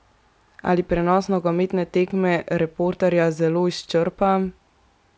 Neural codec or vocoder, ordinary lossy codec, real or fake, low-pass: none; none; real; none